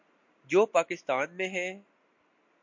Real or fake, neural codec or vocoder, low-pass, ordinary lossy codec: real; none; 7.2 kHz; MP3, 48 kbps